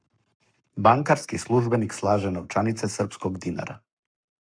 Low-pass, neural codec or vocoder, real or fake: 9.9 kHz; codec, 44.1 kHz, 7.8 kbps, Pupu-Codec; fake